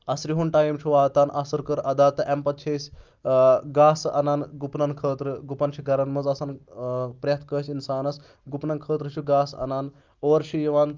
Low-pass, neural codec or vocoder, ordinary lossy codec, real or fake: 7.2 kHz; none; Opus, 24 kbps; real